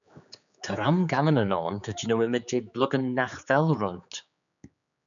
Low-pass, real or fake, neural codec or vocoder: 7.2 kHz; fake; codec, 16 kHz, 4 kbps, X-Codec, HuBERT features, trained on general audio